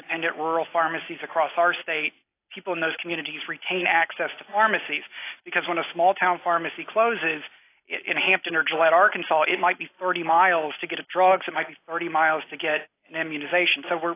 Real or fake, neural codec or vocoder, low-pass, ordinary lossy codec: real; none; 3.6 kHz; AAC, 24 kbps